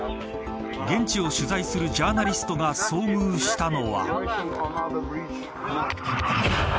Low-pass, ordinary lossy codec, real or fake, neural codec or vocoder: none; none; real; none